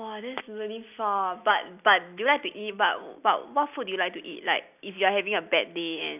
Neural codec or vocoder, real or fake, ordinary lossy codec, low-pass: none; real; none; 3.6 kHz